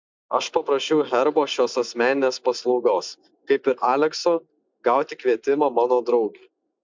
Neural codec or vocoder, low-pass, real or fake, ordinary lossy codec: none; 7.2 kHz; real; MP3, 64 kbps